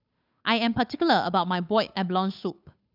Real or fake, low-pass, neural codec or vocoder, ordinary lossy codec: fake; 5.4 kHz; codec, 16 kHz, 8 kbps, FunCodec, trained on Chinese and English, 25 frames a second; none